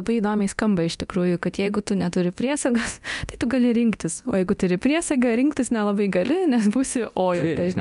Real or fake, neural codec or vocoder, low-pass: fake; codec, 24 kHz, 0.9 kbps, DualCodec; 10.8 kHz